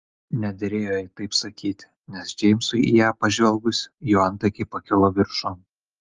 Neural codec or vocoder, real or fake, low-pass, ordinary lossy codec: none; real; 7.2 kHz; Opus, 32 kbps